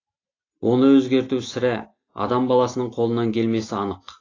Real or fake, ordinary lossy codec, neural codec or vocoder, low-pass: real; AAC, 32 kbps; none; 7.2 kHz